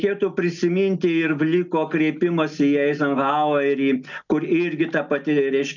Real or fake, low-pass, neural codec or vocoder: real; 7.2 kHz; none